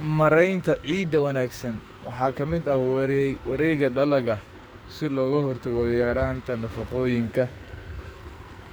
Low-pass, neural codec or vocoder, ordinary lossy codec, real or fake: none; codec, 44.1 kHz, 2.6 kbps, SNAC; none; fake